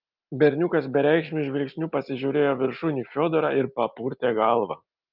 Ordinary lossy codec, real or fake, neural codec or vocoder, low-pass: Opus, 24 kbps; real; none; 5.4 kHz